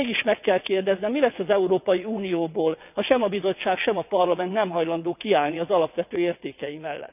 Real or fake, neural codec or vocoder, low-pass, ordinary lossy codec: fake; vocoder, 22.05 kHz, 80 mel bands, WaveNeXt; 3.6 kHz; none